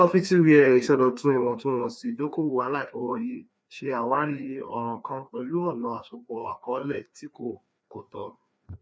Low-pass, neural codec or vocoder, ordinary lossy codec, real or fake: none; codec, 16 kHz, 2 kbps, FreqCodec, larger model; none; fake